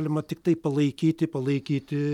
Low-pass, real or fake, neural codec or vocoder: 19.8 kHz; real; none